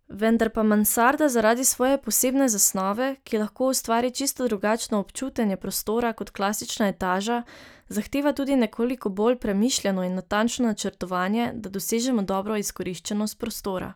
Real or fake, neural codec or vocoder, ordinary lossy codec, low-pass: real; none; none; none